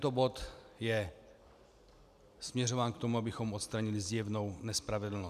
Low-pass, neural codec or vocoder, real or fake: 14.4 kHz; none; real